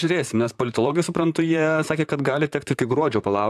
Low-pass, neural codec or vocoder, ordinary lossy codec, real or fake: 14.4 kHz; vocoder, 44.1 kHz, 128 mel bands, Pupu-Vocoder; AAC, 96 kbps; fake